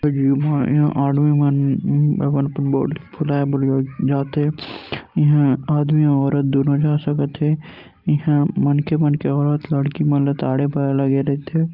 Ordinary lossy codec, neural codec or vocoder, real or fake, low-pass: Opus, 24 kbps; none; real; 5.4 kHz